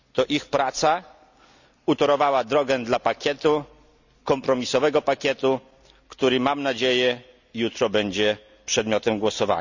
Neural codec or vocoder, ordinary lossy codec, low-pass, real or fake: none; none; 7.2 kHz; real